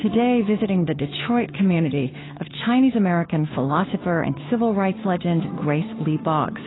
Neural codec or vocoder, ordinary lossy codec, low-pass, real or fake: codec, 16 kHz, 2 kbps, FunCodec, trained on Chinese and English, 25 frames a second; AAC, 16 kbps; 7.2 kHz; fake